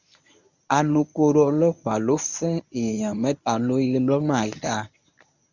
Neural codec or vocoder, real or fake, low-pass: codec, 24 kHz, 0.9 kbps, WavTokenizer, medium speech release version 1; fake; 7.2 kHz